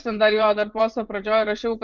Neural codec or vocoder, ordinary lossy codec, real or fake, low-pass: vocoder, 24 kHz, 100 mel bands, Vocos; Opus, 24 kbps; fake; 7.2 kHz